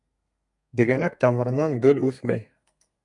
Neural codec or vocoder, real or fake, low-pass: codec, 32 kHz, 1.9 kbps, SNAC; fake; 10.8 kHz